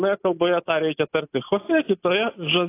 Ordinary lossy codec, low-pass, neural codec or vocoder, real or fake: AAC, 24 kbps; 3.6 kHz; none; real